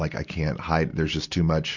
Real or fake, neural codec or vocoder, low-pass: real; none; 7.2 kHz